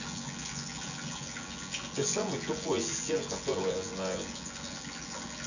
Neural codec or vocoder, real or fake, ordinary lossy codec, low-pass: vocoder, 24 kHz, 100 mel bands, Vocos; fake; none; 7.2 kHz